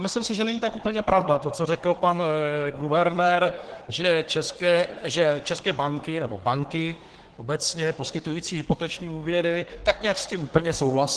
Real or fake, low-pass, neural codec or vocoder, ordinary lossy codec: fake; 10.8 kHz; codec, 24 kHz, 1 kbps, SNAC; Opus, 16 kbps